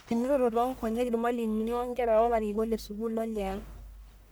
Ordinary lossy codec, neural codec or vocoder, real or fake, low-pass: none; codec, 44.1 kHz, 1.7 kbps, Pupu-Codec; fake; none